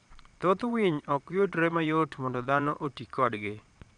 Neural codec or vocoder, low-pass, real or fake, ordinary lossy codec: vocoder, 22.05 kHz, 80 mel bands, Vocos; 9.9 kHz; fake; none